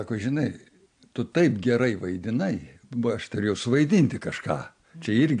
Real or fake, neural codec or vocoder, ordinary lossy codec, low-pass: real; none; AAC, 64 kbps; 9.9 kHz